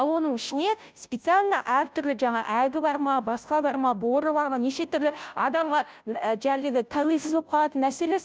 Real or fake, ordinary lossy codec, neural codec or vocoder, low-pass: fake; none; codec, 16 kHz, 0.5 kbps, FunCodec, trained on Chinese and English, 25 frames a second; none